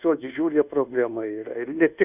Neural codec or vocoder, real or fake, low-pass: codec, 24 kHz, 1.2 kbps, DualCodec; fake; 3.6 kHz